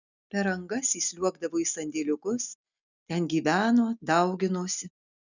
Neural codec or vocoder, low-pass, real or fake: none; 7.2 kHz; real